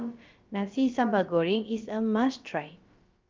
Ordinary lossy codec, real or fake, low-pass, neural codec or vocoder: Opus, 24 kbps; fake; 7.2 kHz; codec, 16 kHz, about 1 kbps, DyCAST, with the encoder's durations